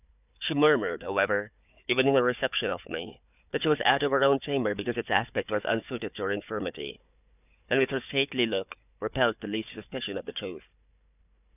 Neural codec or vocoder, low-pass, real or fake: codec, 16 kHz, 4 kbps, FunCodec, trained on Chinese and English, 50 frames a second; 3.6 kHz; fake